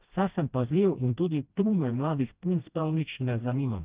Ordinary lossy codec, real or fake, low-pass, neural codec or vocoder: Opus, 32 kbps; fake; 3.6 kHz; codec, 16 kHz, 1 kbps, FreqCodec, smaller model